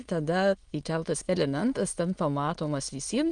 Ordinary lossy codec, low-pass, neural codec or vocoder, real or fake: Opus, 32 kbps; 9.9 kHz; autoencoder, 22.05 kHz, a latent of 192 numbers a frame, VITS, trained on many speakers; fake